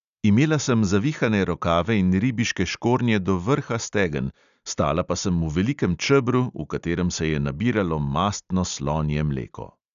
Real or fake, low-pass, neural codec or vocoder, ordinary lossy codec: real; 7.2 kHz; none; none